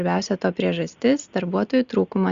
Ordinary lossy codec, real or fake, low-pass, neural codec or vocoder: Opus, 64 kbps; real; 7.2 kHz; none